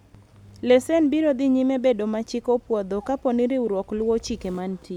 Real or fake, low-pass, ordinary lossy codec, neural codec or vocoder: real; 19.8 kHz; none; none